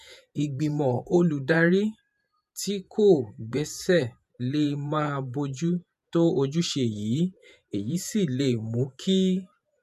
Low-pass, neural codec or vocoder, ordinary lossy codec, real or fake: 14.4 kHz; vocoder, 48 kHz, 128 mel bands, Vocos; none; fake